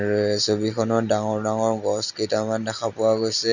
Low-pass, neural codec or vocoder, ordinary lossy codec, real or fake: 7.2 kHz; none; none; real